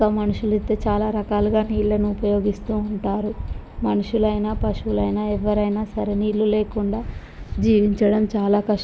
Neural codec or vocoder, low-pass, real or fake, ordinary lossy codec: none; none; real; none